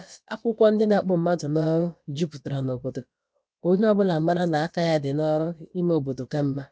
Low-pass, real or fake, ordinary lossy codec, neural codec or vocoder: none; fake; none; codec, 16 kHz, about 1 kbps, DyCAST, with the encoder's durations